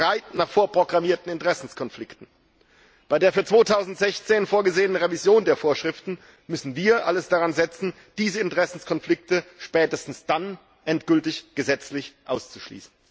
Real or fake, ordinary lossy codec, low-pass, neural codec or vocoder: real; none; none; none